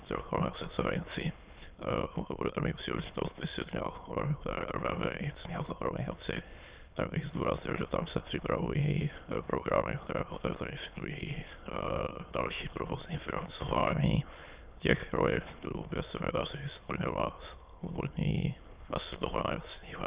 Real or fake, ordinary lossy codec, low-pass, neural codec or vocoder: fake; Opus, 64 kbps; 3.6 kHz; autoencoder, 22.05 kHz, a latent of 192 numbers a frame, VITS, trained on many speakers